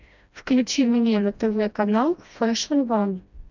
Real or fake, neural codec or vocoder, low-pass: fake; codec, 16 kHz, 1 kbps, FreqCodec, smaller model; 7.2 kHz